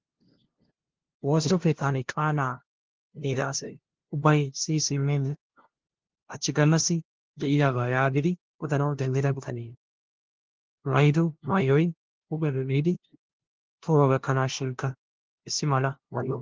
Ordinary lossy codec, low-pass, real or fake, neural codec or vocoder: Opus, 16 kbps; 7.2 kHz; fake; codec, 16 kHz, 0.5 kbps, FunCodec, trained on LibriTTS, 25 frames a second